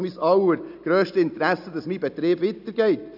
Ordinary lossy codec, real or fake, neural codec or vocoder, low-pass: none; real; none; 5.4 kHz